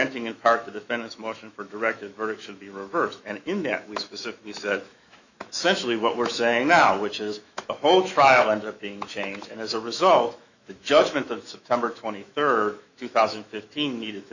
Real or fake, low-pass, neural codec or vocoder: fake; 7.2 kHz; autoencoder, 48 kHz, 128 numbers a frame, DAC-VAE, trained on Japanese speech